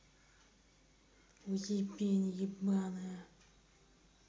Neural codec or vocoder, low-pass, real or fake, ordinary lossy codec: none; none; real; none